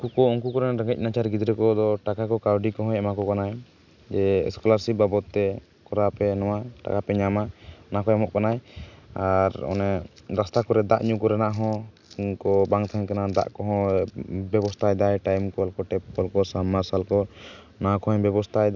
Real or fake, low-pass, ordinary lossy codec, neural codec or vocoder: real; 7.2 kHz; none; none